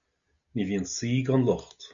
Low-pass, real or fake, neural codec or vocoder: 7.2 kHz; real; none